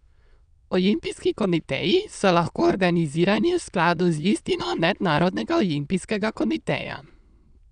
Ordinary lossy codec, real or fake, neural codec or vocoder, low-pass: none; fake; autoencoder, 22.05 kHz, a latent of 192 numbers a frame, VITS, trained on many speakers; 9.9 kHz